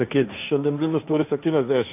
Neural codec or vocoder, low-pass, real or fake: codec, 16 kHz, 1.1 kbps, Voila-Tokenizer; 3.6 kHz; fake